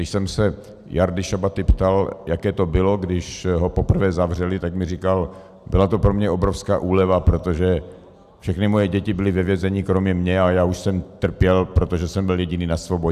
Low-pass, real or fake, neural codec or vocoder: 14.4 kHz; real; none